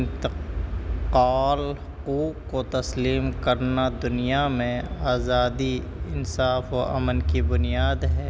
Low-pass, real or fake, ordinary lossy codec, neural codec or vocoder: none; real; none; none